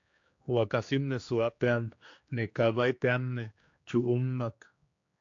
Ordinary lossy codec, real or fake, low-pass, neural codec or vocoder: AAC, 48 kbps; fake; 7.2 kHz; codec, 16 kHz, 2 kbps, X-Codec, HuBERT features, trained on general audio